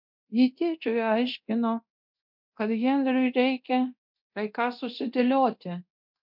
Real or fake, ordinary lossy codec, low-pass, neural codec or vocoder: fake; MP3, 48 kbps; 5.4 kHz; codec, 24 kHz, 0.9 kbps, DualCodec